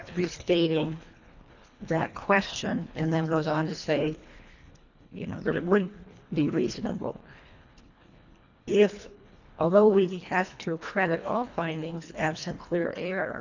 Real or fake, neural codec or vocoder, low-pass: fake; codec, 24 kHz, 1.5 kbps, HILCodec; 7.2 kHz